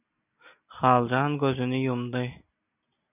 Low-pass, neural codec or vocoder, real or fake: 3.6 kHz; none; real